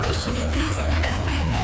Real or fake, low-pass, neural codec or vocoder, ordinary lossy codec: fake; none; codec, 16 kHz, 2 kbps, FreqCodec, larger model; none